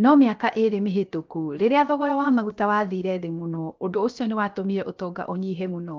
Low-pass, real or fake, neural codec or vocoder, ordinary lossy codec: 7.2 kHz; fake; codec, 16 kHz, 0.7 kbps, FocalCodec; Opus, 24 kbps